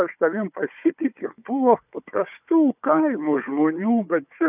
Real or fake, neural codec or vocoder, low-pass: fake; codec, 16 kHz, 4 kbps, FunCodec, trained on Chinese and English, 50 frames a second; 3.6 kHz